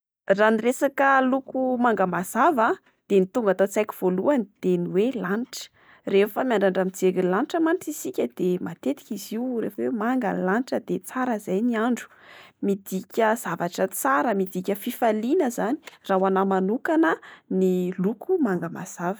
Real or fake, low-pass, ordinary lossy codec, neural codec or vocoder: real; none; none; none